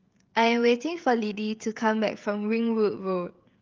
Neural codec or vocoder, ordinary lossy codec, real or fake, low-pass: codec, 16 kHz, 16 kbps, FreqCodec, smaller model; Opus, 32 kbps; fake; 7.2 kHz